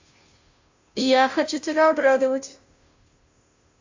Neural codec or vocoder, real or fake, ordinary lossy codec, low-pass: codec, 16 kHz, 0.5 kbps, FunCodec, trained on Chinese and English, 25 frames a second; fake; AAC, 48 kbps; 7.2 kHz